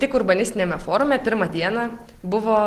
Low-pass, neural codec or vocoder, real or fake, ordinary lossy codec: 14.4 kHz; none; real; Opus, 16 kbps